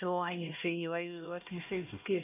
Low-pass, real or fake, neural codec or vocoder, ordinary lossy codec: 3.6 kHz; fake; codec, 16 kHz, 1 kbps, X-Codec, HuBERT features, trained on LibriSpeech; none